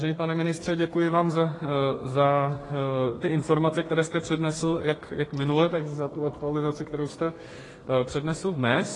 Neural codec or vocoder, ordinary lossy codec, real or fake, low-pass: codec, 32 kHz, 1.9 kbps, SNAC; AAC, 32 kbps; fake; 10.8 kHz